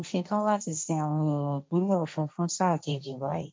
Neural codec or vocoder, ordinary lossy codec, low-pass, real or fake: codec, 16 kHz, 1.1 kbps, Voila-Tokenizer; none; none; fake